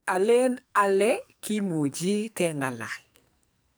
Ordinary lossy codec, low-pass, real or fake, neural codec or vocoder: none; none; fake; codec, 44.1 kHz, 2.6 kbps, SNAC